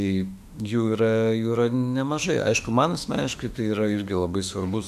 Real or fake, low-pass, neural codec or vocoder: fake; 14.4 kHz; autoencoder, 48 kHz, 32 numbers a frame, DAC-VAE, trained on Japanese speech